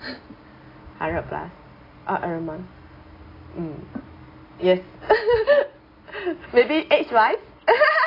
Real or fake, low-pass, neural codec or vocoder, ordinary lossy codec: real; 5.4 kHz; none; AAC, 24 kbps